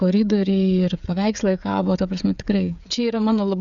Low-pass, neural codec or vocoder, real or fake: 7.2 kHz; codec, 16 kHz, 16 kbps, FreqCodec, smaller model; fake